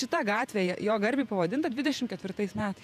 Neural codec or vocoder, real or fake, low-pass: vocoder, 48 kHz, 128 mel bands, Vocos; fake; 14.4 kHz